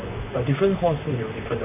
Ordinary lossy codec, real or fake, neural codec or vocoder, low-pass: AAC, 24 kbps; fake; vocoder, 44.1 kHz, 128 mel bands, Pupu-Vocoder; 3.6 kHz